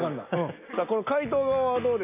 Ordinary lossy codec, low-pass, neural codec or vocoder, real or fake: none; 3.6 kHz; none; real